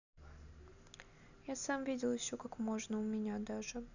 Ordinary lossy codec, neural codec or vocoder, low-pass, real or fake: none; none; 7.2 kHz; real